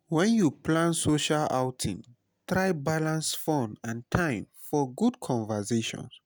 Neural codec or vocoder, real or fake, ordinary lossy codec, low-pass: none; real; none; none